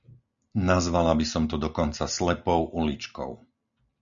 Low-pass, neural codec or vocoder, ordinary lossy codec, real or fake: 7.2 kHz; none; MP3, 96 kbps; real